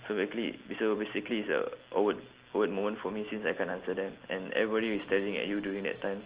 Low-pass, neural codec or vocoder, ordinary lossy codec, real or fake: 3.6 kHz; none; Opus, 16 kbps; real